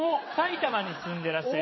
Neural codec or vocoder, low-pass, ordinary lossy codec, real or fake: vocoder, 22.05 kHz, 80 mel bands, WaveNeXt; 7.2 kHz; MP3, 24 kbps; fake